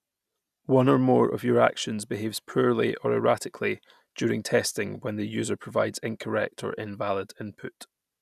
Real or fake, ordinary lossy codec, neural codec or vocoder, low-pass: real; none; none; 14.4 kHz